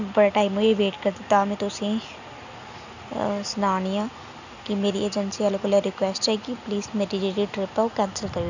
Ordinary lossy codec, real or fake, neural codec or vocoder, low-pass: none; real; none; 7.2 kHz